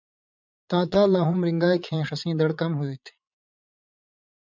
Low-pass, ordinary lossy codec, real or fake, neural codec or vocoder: 7.2 kHz; MP3, 64 kbps; fake; vocoder, 22.05 kHz, 80 mel bands, Vocos